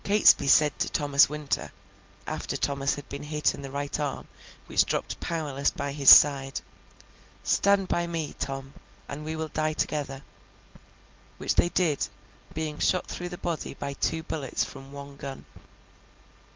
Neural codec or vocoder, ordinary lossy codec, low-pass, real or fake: none; Opus, 32 kbps; 7.2 kHz; real